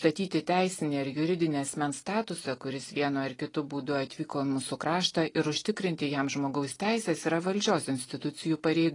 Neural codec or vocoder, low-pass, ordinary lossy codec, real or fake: none; 10.8 kHz; AAC, 32 kbps; real